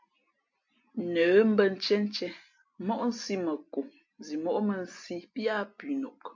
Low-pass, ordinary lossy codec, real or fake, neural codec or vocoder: 7.2 kHz; MP3, 48 kbps; real; none